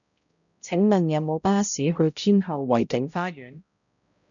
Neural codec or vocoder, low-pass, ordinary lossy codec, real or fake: codec, 16 kHz, 0.5 kbps, X-Codec, HuBERT features, trained on balanced general audio; 7.2 kHz; MP3, 96 kbps; fake